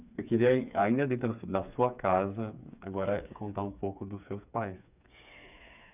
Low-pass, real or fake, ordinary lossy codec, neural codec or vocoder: 3.6 kHz; fake; none; codec, 16 kHz, 4 kbps, FreqCodec, smaller model